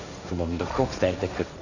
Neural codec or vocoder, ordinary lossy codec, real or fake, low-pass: codec, 16 kHz, 1.1 kbps, Voila-Tokenizer; none; fake; 7.2 kHz